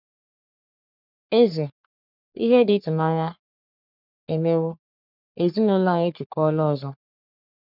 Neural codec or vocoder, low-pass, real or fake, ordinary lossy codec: codec, 44.1 kHz, 3.4 kbps, Pupu-Codec; 5.4 kHz; fake; none